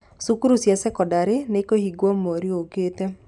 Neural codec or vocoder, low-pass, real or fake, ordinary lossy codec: none; 10.8 kHz; real; none